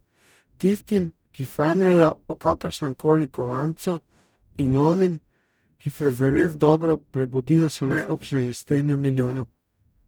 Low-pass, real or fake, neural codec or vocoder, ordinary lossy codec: none; fake; codec, 44.1 kHz, 0.9 kbps, DAC; none